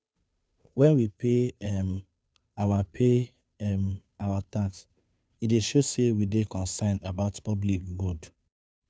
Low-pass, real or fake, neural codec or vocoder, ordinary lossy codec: none; fake; codec, 16 kHz, 2 kbps, FunCodec, trained on Chinese and English, 25 frames a second; none